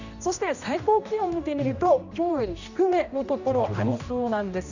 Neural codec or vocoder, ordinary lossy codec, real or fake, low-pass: codec, 16 kHz, 1 kbps, X-Codec, HuBERT features, trained on general audio; none; fake; 7.2 kHz